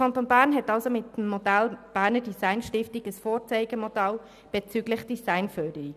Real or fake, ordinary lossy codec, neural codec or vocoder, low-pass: real; none; none; 14.4 kHz